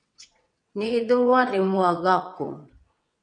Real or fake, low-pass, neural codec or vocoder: fake; 9.9 kHz; vocoder, 22.05 kHz, 80 mel bands, WaveNeXt